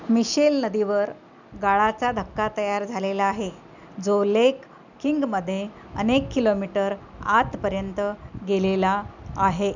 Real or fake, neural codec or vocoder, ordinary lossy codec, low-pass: real; none; none; 7.2 kHz